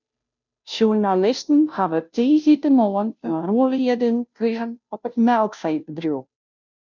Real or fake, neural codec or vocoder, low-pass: fake; codec, 16 kHz, 0.5 kbps, FunCodec, trained on Chinese and English, 25 frames a second; 7.2 kHz